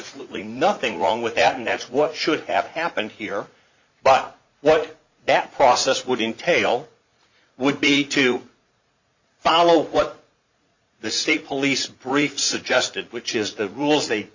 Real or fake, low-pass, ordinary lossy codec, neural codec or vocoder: fake; 7.2 kHz; Opus, 64 kbps; vocoder, 44.1 kHz, 80 mel bands, Vocos